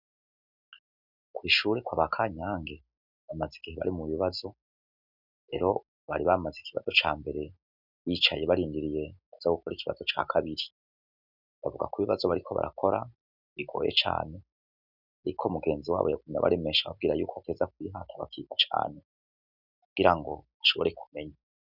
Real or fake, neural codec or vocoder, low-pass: real; none; 5.4 kHz